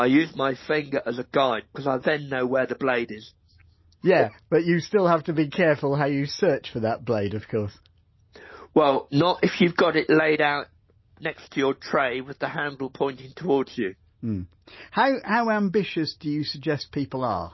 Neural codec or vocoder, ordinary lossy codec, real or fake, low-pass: none; MP3, 24 kbps; real; 7.2 kHz